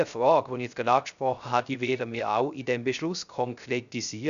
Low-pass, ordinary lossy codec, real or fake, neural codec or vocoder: 7.2 kHz; none; fake; codec, 16 kHz, 0.3 kbps, FocalCodec